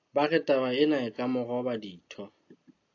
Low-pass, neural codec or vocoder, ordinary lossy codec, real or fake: 7.2 kHz; none; AAC, 48 kbps; real